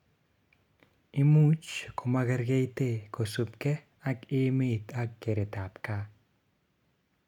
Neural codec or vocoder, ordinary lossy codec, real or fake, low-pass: none; none; real; 19.8 kHz